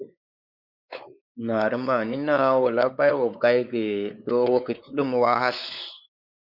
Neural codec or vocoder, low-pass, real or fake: codec, 16 kHz, 4 kbps, X-Codec, WavLM features, trained on Multilingual LibriSpeech; 5.4 kHz; fake